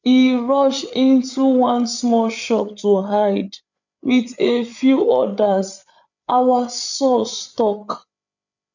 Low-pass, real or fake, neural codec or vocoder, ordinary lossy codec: 7.2 kHz; fake; codec, 16 kHz, 8 kbps, FreqCodec, larger model; none